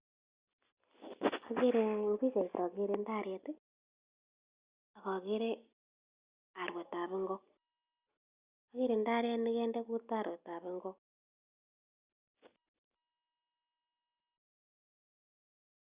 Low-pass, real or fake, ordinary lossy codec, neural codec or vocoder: 3.6 kHz; real; Opus, 64 kbps; none